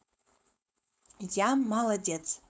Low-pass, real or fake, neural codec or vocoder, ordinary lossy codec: none; fake; codec, 16 kHz, 4.8 kbps, FACodec; none